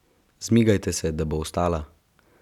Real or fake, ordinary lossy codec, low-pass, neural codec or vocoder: real; none; 19.8 kHz; none